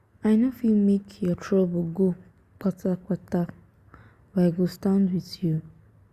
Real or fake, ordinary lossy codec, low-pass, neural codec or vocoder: real; Opus, 64 kbps; 14.4 kHz; none